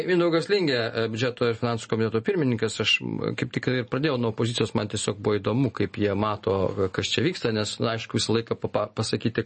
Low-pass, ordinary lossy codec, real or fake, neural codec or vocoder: 10.8 kHz; MP3, 32 kbps; real; none